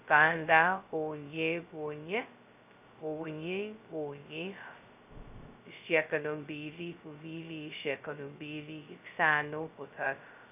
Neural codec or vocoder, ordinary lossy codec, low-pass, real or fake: codec, 16 kHz, 0.2 kbps, FocalCodec; AAC, 32 kbps; 3.6 kHz; fake